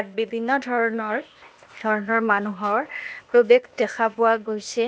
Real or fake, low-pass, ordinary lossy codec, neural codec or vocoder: fake; none; none; codec, 16 kHz, 0.8 kbps, ZipCodec